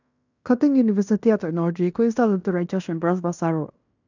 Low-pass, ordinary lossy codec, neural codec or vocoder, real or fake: 7.2 kHz; none; codec, 16 kHz in and 24 kHz out, 0.9 kbps, LongCat-Audio-Codec, fine tuned four codebook decoder; fake